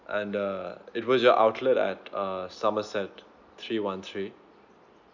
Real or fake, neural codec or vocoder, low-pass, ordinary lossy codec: real; none; 7.2 kHz; none